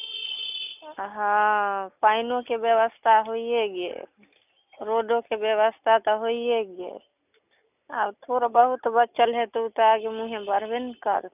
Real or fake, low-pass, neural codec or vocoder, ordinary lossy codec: real; 3.6 kHz; none; none